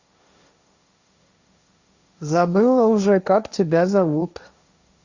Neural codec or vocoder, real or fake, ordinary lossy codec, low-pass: codec, 16 kHz, 1.1 kbps, Voila-Tokenizer; fake; Opus, 64 kbps; 7.2 kHz